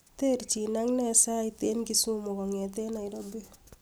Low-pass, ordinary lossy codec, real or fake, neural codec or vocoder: none; none; real; none